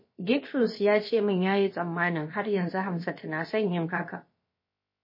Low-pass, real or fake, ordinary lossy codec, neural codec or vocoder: 5.4 kHz; fake; MP3, 24 kbps; codec, 16 kHz, about 1 kbps, DyCAST, with the encoder's durations